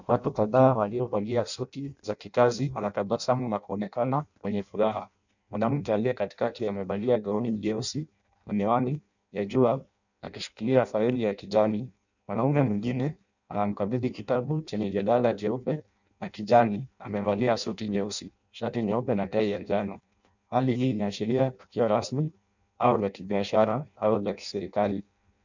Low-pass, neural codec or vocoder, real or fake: 7.2 kHz; codec, 16 kHz in and 24 kHz out, 0.6 kbps, FireRedTTS-2 codec; fake